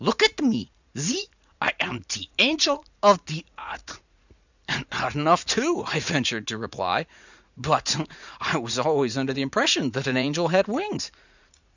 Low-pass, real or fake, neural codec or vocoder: 7.2 kHz; fake; vocoder, 44.1 kHz, 80 mel bands, Vocos